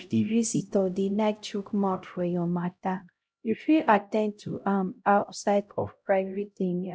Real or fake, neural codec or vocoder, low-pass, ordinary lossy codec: fake; codec, 16 kHz, 0.5 kbps, X-Codec, HuBERT features, trained on LibriSpeech; none; none